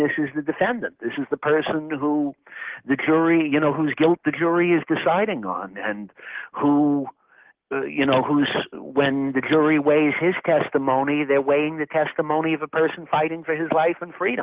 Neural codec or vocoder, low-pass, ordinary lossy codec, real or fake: none; 3.6 kHz; Opus, 16 kbps; real